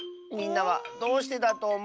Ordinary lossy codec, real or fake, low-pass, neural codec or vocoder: none; real; none; none